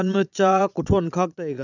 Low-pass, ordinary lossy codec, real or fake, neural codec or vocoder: 7.2 kHz; none; fake; vocoder, 22.05 kHz, 80 mel bands, Vocos